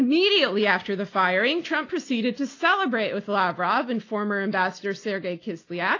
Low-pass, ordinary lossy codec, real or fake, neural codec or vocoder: 7.2 kHz; AAC, 32 kbps; real; none